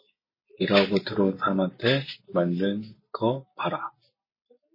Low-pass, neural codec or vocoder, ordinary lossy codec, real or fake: 5.4 kHz; none; MP3, 24 kbps; real